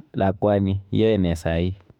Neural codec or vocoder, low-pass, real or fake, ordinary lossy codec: autoencoder, 48 kHz, 32 numbers a frame, DAC-VAE, trained on Japanese speech; 19.8 kHz; fake; none